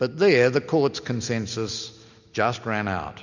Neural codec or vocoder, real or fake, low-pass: none; real; 7.2 kHz